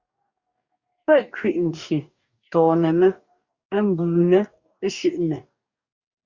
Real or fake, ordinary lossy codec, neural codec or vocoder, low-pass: fake; Opus, 64 kbps; codec, 44.1 kHz, 2.6 kbps, DAC; 7.2 kHz